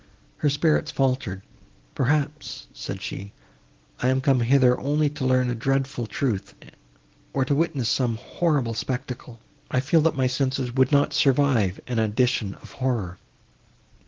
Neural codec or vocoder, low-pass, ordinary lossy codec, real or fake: none; 7.2 kHz; Opus, 16 kbps; real